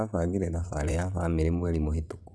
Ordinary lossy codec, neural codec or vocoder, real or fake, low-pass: none; vocoder, 22.05 kHz, 80 mel bands, WaveNeXt; fake; none